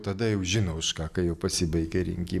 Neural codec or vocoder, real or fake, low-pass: none; real; 14.4 kHz